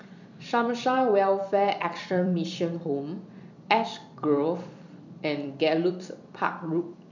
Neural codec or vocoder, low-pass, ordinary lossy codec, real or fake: vocoder, 44.1 kHz, 128 mel bands every 256 samples, BigVGAN v2; 7.2 kHz; none; fake